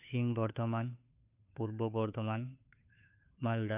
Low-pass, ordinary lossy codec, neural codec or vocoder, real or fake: 3.6 kHz; none; codec, 16 kHz, 2 kbps, FunCodec, trained on Chinese and English, 25 frames a second; fake